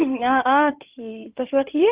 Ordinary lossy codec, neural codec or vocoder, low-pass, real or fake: Opus, 24 kbps; codec, 16 kHz in and 24 kHz out, 2.2 kbps, FireRedTTS-2 codec; 3.6 kHz; fake